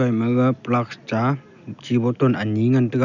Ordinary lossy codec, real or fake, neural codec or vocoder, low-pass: none; real; none; 7.2 kHz